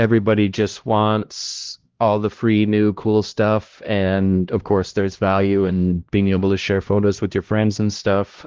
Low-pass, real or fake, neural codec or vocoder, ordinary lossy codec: 7.2 kHz; fake; codec, 16 kHz, 1 kbps, X-Codec, HuBERT features, trained on LibriSpeech; Opus, 16 kbps